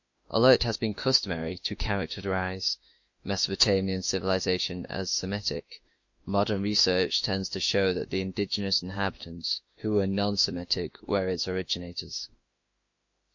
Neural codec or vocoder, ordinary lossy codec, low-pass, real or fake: autoencoder, 48 kHz, 32 numbers a frame, DAC-VAE, trained on Japanese speech; MP3, 48 kbps; 7.2 kHz; fake